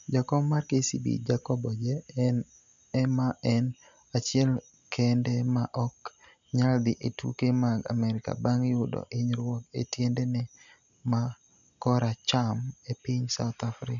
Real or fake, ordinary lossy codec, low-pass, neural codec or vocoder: real; none; 7.2 kHz; none